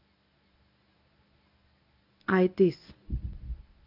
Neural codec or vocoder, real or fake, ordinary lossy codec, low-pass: codec, 24 kHz, 0.9 kbps, WavTokenizer, medium speech release version 1; fake; AAC, 48 kbps; 5.4 kHz